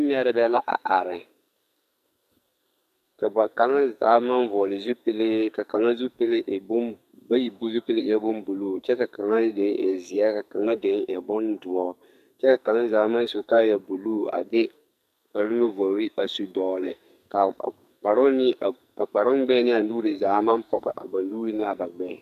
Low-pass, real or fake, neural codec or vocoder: 14.4 kHz; fake; codec, 44.1 kHz, 2.6 kbps, SNAC